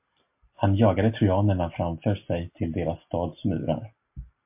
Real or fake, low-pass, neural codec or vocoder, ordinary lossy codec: real; 3.6 kHz; none; MP3, 32 kbps